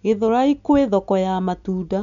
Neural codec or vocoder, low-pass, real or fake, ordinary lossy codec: none; 7.2 kHz; real; none